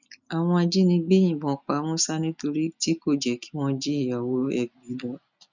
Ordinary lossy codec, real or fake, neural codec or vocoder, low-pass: none; real; none; 7.2 kHz